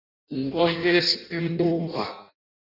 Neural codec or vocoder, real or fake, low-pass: codec, 16 kHz in and 24 kHz out, 0.6 kbps, FireRedTTS-2 codec; fake; 5.4 kHz